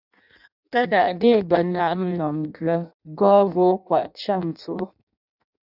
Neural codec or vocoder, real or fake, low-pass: codec, 16 kHz in and 24 kHz out, 0.6 kbps, FireRedTTS-2 codec; fake; 5.4 kHz